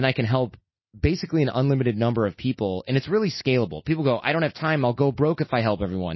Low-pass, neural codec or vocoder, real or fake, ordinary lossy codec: 7.2 kHz; none; real; MP3, 24 kbps